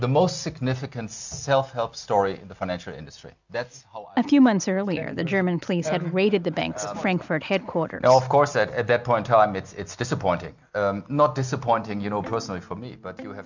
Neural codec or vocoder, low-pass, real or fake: none; 7.2 kHz; real